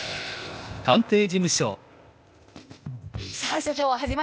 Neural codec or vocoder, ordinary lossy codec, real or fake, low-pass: codec, 16 kHz, 0.8 kbps, ZipCodec; none; fake; none